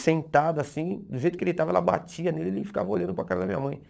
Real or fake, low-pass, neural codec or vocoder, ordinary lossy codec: fake; none; codec, 16 kHz, 16 kbps, FunCodec, trained on LibriTTS, 50 frames a second; none